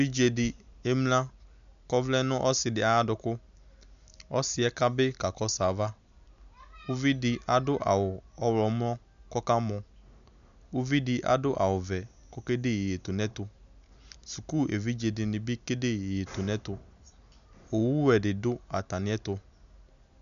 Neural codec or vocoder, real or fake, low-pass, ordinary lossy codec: none; real; 7.2 kHz; MP3, 96 kbps